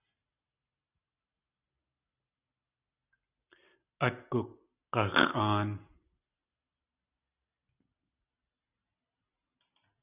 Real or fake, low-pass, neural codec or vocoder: real; 3.6 kHz; none